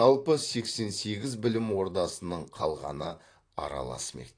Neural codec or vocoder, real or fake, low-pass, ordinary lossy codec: vocoder, 44.1 kHz, 128 mel bands, Pupu-Vocoder; fake; 9.9 kHz; AAC, 48 kbps